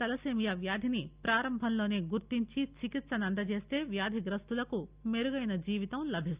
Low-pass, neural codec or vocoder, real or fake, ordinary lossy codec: 3.6 kHz; none; real; Opus, 64 kbps